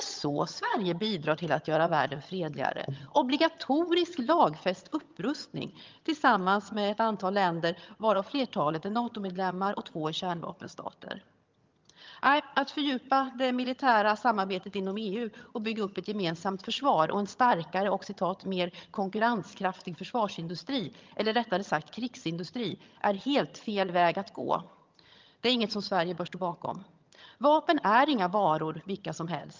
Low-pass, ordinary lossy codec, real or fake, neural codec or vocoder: 7.2 kHz; Opus, 24 kbps; fake; vocoder, 22.05 kHz, 80 mel bands, HiFi-GAN